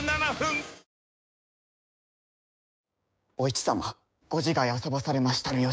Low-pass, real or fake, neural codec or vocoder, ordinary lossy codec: none; fake; codec, 16 kHz, 6 kbps, DAC; none